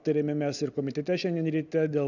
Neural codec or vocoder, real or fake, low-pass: none; real; 7.2 kHz